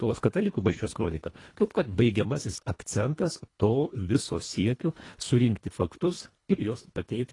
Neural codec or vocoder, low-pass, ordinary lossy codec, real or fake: codec, 24 kHz, 1.5 kbps, HILCodec; 10.8 kHz; AAC, 32 kbps; fake